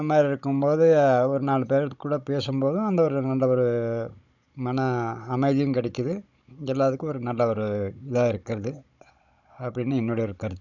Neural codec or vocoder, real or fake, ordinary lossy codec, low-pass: codec, 16 kHz, 16 kbps, FunCodec, trained on Chinese and English, 50 frames a second; fake; none; 7.2 kHz